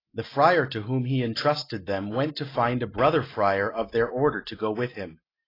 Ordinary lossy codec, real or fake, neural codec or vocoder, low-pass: AAC, 24 kbps; real; none; 5.4 kHz